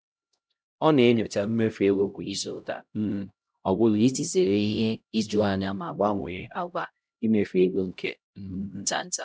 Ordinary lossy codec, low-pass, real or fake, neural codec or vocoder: none; none; fake; codec, 16 kHz, 0.5 kbps, X-Codec, HuBERT features, trained on LibriSpeech